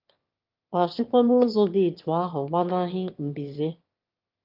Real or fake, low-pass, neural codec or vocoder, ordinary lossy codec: fake; 5.4 kHz; autoencoder, 22.05 kHz, a latent of 192 numbers a frame, VITS, trained on one speaker; Opus, 24 kbps